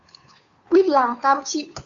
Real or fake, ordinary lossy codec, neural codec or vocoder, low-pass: fake; Opus, 64 kbps; codec, 16 kHz, 2 kbps, FunCodec, trained on Chinese and English, 25 frames a second; 7.2 kHz